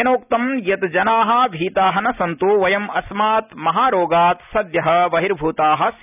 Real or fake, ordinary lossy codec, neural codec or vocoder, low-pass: real; none; none; 3.6 kHz